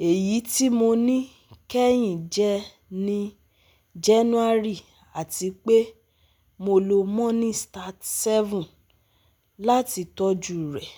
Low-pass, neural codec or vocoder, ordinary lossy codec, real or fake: none; none; none; real